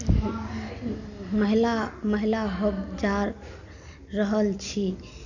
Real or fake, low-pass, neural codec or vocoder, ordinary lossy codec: real; 7.2 kHz; none; none